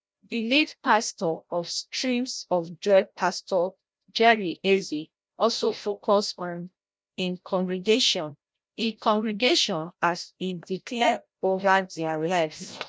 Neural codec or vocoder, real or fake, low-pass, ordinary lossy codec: codec, 16 kHz, 0.5 kbps, FreqCodec, larger model; fake; none; none